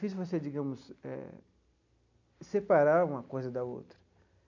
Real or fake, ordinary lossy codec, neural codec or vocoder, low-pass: real; none; none; 7.2 kHz